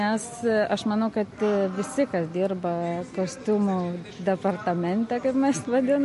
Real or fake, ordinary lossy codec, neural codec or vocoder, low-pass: real; MP3, 48 kbps; none; 14.4 kHz